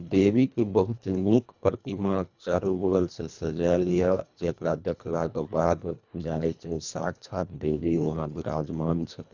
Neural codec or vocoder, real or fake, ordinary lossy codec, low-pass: codec, 24 kHz, 1.5 kbps, HILCodec; fake; none; 7.2 kHz